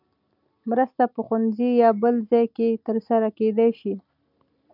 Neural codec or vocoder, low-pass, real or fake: none; 5.4 kHz; real